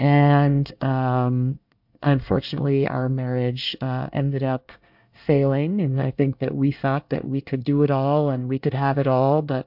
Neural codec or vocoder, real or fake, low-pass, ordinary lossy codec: codec, 24 kHz, 1 kbps, SNAC; fake; 5.4 kHz; MP3, 48 kbps